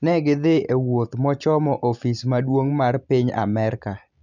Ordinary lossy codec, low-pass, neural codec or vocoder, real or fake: none; 7.2 kHz; none; real